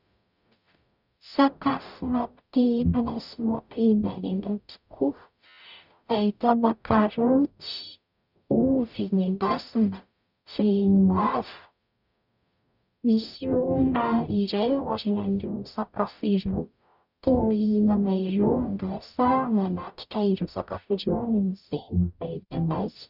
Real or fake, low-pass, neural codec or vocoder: fake; 5.4 kHz; codec, 44.1 kHz, 0.9 kbps, DAC